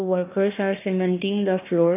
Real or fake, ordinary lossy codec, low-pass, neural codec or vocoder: fake; AAC, 24 kbps; 3.6 kHz; codec, 16 kHz, 1 kbps, FunCodec, trained on Chinese and English, 50 frames a second